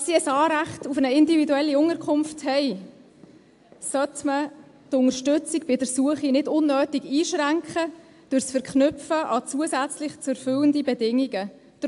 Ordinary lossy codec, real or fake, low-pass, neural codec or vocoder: AAC, 64 kbps; real; 10.8 kHz; none